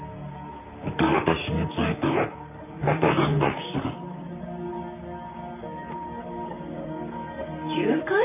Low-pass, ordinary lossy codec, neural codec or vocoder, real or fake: 3.6 kHz; none; vocoder, 44.1 kHz, 128 mel bands, Pupu-Vocoder; fake